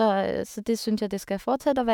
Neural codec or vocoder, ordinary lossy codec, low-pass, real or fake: autoencoder, 48 kHz, 128 numbers a frame, DAC-VAE, trained on Japanese speech; none; 19.8 kHz; fake